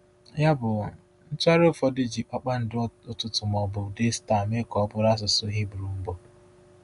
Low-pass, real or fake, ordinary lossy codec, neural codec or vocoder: 10.8 kHz; real; none; none